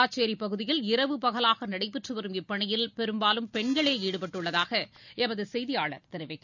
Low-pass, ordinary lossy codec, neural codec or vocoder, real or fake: 7.2 kHz; none; none; real